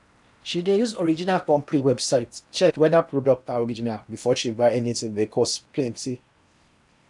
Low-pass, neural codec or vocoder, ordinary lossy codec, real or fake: 10.8 kHz; codec, 16 kHz in and 24 kHz out, 0.8 kbps, FocalCodec, streaming, 65536 codes; none; fake